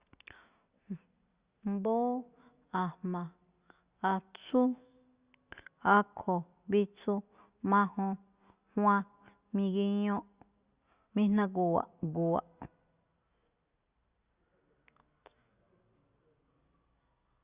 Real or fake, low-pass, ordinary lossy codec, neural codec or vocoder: real; 3.6 kHz; Opus, 64 kbps; none